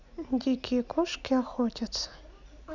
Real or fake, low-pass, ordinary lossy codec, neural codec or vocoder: real; 7.2 kHz; none; none